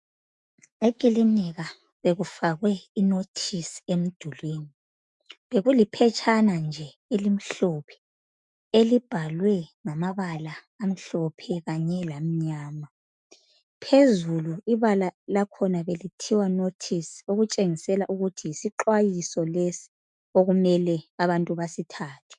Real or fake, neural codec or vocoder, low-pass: fake; autoencoder, 48 kHz, 128 numbers a frame, DAC-VAE, trained on Japanese speech; 10.8 kHz